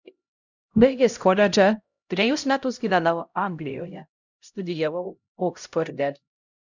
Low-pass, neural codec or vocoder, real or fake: 7.2 kHz; codec, 16 kHz, 0.5 kbps, X-Codec, HuBERT features, trained on LibriSpeech; fake